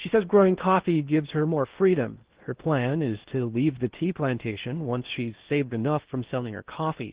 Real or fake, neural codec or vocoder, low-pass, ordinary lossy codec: fake; codec, 16 kHz in and 24 kHz out, 0.8 kbps, FocalCodec, streaming, 65536 codes; 3.6 kHz; Opus, 16 kbps